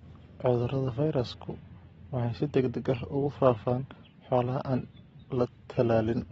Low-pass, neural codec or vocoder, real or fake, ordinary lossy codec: 19.8 kHz; none; real; AAC, 24 kbps